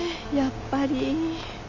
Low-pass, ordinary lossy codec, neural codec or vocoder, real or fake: 7.2 kHz; none; none; real